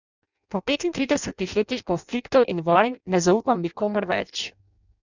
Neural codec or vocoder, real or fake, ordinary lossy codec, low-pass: codec, 16 kHz in and 24 kHz out, 0.6 kbps, FireRedTTS-2 codec; fake; none; 7.2 kHz